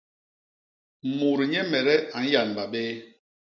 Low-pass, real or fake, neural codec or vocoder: 7.2 kHz; real; none